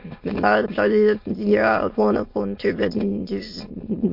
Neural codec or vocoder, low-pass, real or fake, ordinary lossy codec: autoencoder, 22.05 kHz, a latent of 192 numbers a frame, VITS, trained on many speakers; 5.4 kHz; fake; MP3, 32 kbps